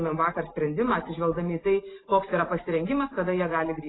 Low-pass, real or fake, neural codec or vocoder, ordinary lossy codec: 7.2 kHz; real; none; AAC, 16 kbps